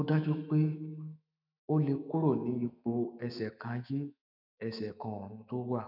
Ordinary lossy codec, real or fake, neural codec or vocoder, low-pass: none; fake; autoencoder, 48 kHz, 128 numbers a frame, DAC-VAE, trained on Japanese speech; 5.4 kHz